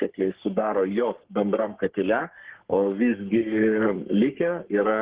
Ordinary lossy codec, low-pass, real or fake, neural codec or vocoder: Opus, 16 kbps; 3.6 kHz; fake; codec, 44.1 kHz, 3.4 kbps, Pupu-Codec